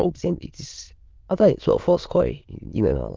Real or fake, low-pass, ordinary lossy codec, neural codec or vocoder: fake; 7.2 kHz; Opus, 32 kbps; autoencoder, 22.05 kHz, a latent of 192 numbers a frame, VITS, trained on many speakers